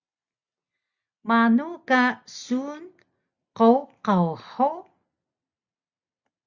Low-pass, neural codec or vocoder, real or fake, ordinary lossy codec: 7.2 kHz; none; real; Opus, 64 kbps